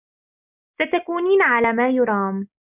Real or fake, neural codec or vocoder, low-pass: real; none; 3.6 kHz